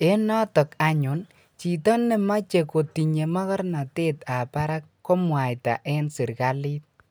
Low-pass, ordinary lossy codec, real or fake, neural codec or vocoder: none; none; real; none